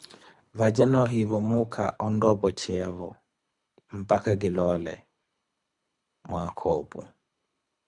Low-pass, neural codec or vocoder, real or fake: 10.8 kHz; codec, 24 kHz, 3 kbps, HILCodec; fake